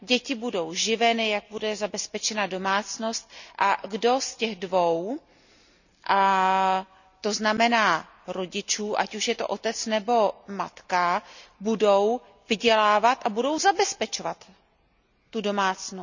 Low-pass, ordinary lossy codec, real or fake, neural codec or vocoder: 7.2 kHz; none; real; none